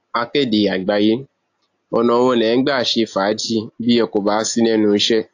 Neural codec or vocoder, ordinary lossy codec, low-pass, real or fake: none; AAC, 48 kbps; 7.2 kHz; real